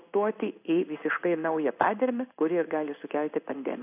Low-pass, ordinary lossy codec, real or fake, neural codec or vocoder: 3.6 kHz; AAC, 32 kbps; fake; codec, 16 kHz in and 24 kHz out, 1 kbps, XY-Tokenizer